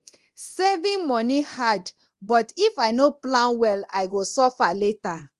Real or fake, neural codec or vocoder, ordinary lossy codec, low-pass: fake; codec, 24 kHz, 0.9 kbps, DualCodec; Opus, 24 kbps; 10.8 kHz